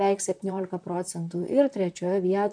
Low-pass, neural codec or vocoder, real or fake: 9.9 kHz; vocoder, 44.1 kHz, 128 mel bands, Pupu-Vocoder; fake